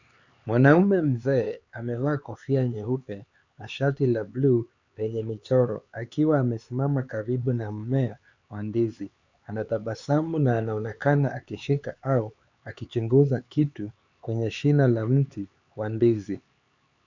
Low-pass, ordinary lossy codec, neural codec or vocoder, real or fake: 7.2 kHz; Opus, 64 kbps; codec, 16 kHz, 4 kbps, X-Codec, HuBERT features, trained on LibriSpeech; fake